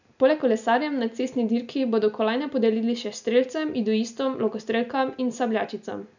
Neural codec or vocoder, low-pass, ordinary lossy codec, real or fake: none; 7.2 kHz; none; real